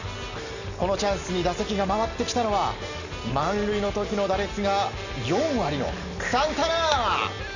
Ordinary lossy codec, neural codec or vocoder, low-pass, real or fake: none; none; 7.2 kHz; real